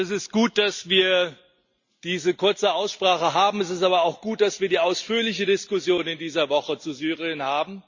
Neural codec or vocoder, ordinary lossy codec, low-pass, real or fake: none; Opus, 64 kbps; 7.2 kHz; real